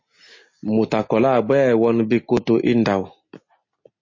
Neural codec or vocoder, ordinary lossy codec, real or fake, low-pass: none; MP3, 32 kbps; real; 7.2 kHz